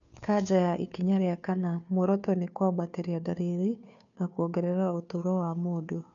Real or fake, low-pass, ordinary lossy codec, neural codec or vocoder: fake; 7.2 kHz; none; codec, 16 kHz, 2 kbps, FunCodec, trained on Chinese and English, 25 frames a second